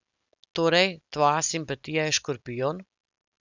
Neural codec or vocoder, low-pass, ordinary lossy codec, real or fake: none; 7.2 kHz; none; real